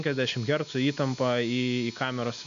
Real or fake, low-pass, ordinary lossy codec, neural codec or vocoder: real; 7.2 kHz; AAC, 96 kbps; none